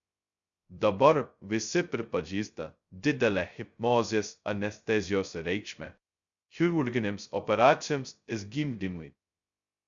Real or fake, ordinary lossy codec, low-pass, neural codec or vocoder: fake; Opus, 64 kbps; 7.2 kHz; codec, 16 kHz, 0.2 kbps, FocalCodec